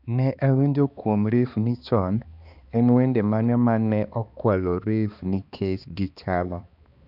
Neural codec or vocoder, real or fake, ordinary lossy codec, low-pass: codec, 16 kHz, 2 kbps, X-Codec, HuBERT features, trained on balanced general audio; fake; none; 5.4 kHz